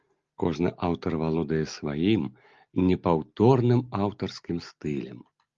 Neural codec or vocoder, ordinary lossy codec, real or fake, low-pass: none; Opus, 32 kbps; real; 7.2 kHz